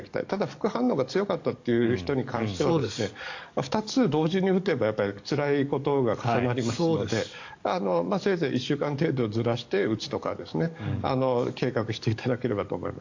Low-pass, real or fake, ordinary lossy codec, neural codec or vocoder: 7.2 kHz; fake; AAC, 48 kbps; codec, 44.1 kHz, 7.8 kbps, DAC